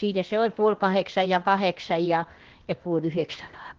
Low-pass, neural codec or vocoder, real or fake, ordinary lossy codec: 7.2 kHz; codec, 16 kHz, 0.8 kbps, ZipCodec; fake; Opus, 16 kbps